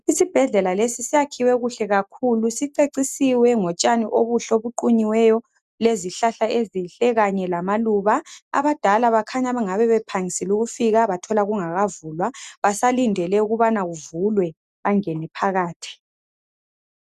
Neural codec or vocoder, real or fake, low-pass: none; real; 14.4 kHz